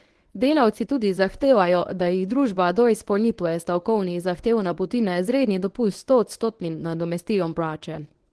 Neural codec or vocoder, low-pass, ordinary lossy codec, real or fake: codec, 24 kHz, 0.9 kbps, WavTokenizer, medium speech release version 1; 10.8 kHz; Opus, 16 kbps; fake